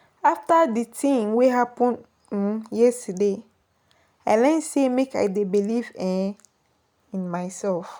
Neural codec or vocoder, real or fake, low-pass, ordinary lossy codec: none; real; none; none